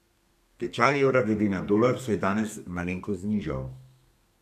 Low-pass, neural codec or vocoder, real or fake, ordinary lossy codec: 14.4 kHz; codec, 32 kHz, 1.9 kbps, SNAC; fake; none